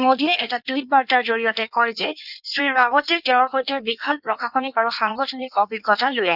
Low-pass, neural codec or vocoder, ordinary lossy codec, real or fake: 5.4 kHz; codec, 16 kHz in and 24 kHz out, 1.1 kbps, FireRedTTS-2 codec; none; fake